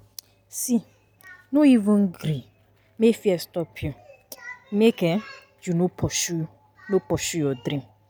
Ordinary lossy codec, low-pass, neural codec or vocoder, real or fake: none; none; none; real